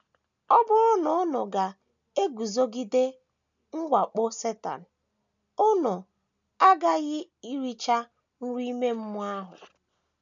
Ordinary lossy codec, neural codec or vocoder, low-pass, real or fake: none; none; 7.2 kHz; real